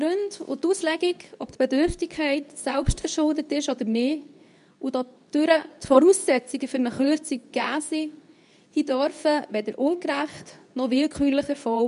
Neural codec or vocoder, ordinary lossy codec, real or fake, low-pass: codec, 24 kHz, 0.9 kbps, WavTokenizer, medium speech release version 2; none; fake; 10.8 kHz